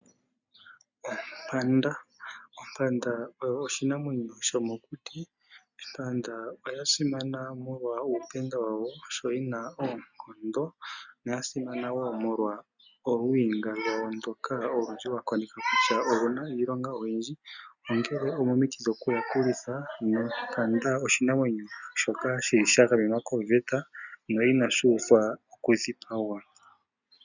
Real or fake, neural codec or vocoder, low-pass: real; none; 7.2 kHz